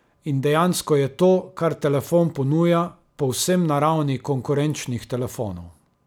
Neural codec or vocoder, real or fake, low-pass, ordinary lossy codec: none; real; none; none